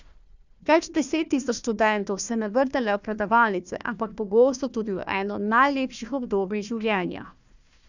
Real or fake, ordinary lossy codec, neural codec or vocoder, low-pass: fake; none; codec, 16 kHz, 1 kbps, FunCodec, trained on Chinese and English, 50 frames a second; 7.2 kHz